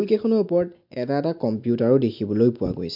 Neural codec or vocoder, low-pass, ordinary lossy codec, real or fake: none; 5.4 kHz; MP3, 48 kbps; real